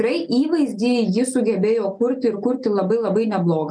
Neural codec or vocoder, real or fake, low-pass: none; real; 9.9 kHz